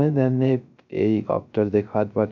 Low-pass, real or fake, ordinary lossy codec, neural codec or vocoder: 7.2 kHz; fake; none; codec, 16 kHz, 0.3 kbps, FocalCodec